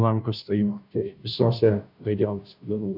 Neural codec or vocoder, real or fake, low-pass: codec, 16 kHz, 0.5 kbps, FunCodec, trained on Chinese and English, 25 frames a second; fake; 5.4 kHz